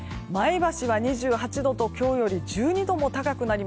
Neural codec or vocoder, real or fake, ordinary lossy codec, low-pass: none; real; none; none